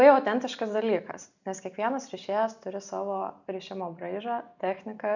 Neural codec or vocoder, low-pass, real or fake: none; 7.2 kHz; real